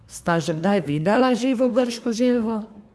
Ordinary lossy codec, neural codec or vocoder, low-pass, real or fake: none; codec, 24 kHz, 1 kbps, SNAC; none; fake